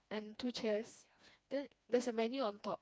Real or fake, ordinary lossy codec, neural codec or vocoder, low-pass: fake; none; codec, 16 kHz, 2 kbps, FreqCodec, smaller model; none